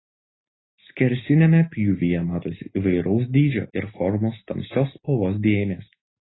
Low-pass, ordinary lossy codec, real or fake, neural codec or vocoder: 7.2 kHz; AAC, 16 kbps; real; none